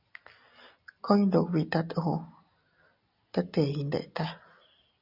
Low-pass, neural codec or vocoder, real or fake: 5.4 kHz; none; real